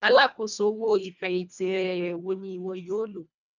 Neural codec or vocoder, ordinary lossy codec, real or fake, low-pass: codec, 24 kHz, 1.5 kbps, HILCodec; none; fake; 7.2 kHz